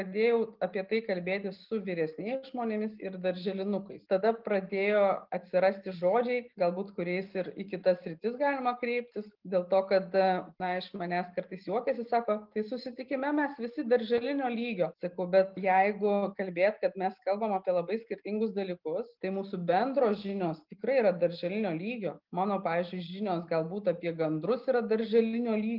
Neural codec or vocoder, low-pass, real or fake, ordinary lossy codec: none; 5.4 kHz; real; Opus, 24 kbps